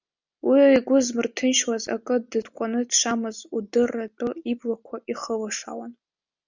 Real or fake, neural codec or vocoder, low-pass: real; none; 7.2 kHz